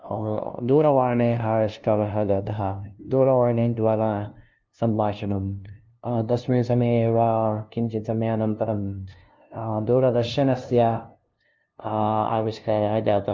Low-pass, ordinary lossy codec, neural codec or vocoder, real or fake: 7.2 kHz; Opus, 24 kbps; codec, 16 kHz, 0.5 kbps, FunCodec, trained on LibriTTS, 25 frames a second; fake